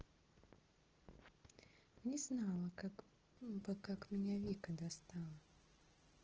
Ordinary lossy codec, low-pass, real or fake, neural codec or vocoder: Opus, 16 kbps; 7.2 kHz; real; none